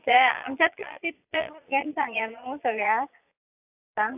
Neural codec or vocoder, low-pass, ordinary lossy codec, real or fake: vocoder, 22.05 kHz, 80 mel bands, Vocos; 3.6 kHz; AAC, 32 kbps; fake